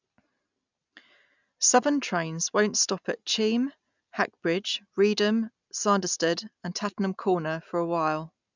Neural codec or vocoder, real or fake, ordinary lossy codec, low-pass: none; real; none; 7.2 kHz